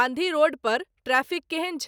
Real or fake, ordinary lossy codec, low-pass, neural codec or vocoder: real; none; none; none